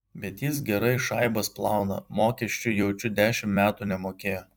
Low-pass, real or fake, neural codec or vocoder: 19.8 kHz; fake; vocoder, 44.1 kHz, 128 mel bands every 256 samples, BigVGAN v2